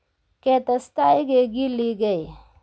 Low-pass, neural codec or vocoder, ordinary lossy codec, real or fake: none; none; none; real